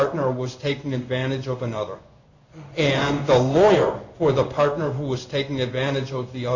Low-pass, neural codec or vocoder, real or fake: 7.2 kHz; codec, 16 kHz in and 24 kHz out, 1 kbps, XY-Tokenizer; fake